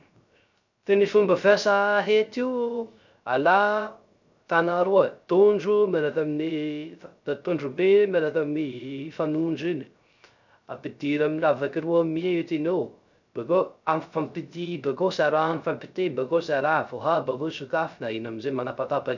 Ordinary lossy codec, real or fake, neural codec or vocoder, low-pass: none; fake; codec, 16 kHz, 0.3 kbps, FocalCodec; 7.2 kHz